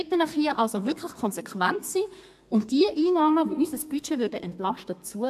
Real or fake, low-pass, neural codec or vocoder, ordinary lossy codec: fake; 14.4 kHz; codec, 32 kHz, 1.9 kbps, SNAC; AAC, 96 kbps